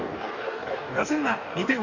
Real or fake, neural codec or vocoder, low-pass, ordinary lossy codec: fake; codec, 44.1 kHz, 2.6 kbps, DAC; 7.2 kHz; none